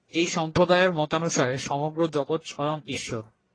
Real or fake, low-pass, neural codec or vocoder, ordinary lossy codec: fake; 9.9 kHz; codec, 44.1 kHz, 1.7 kbps, Pupu-Codec; AAC, 32 kbps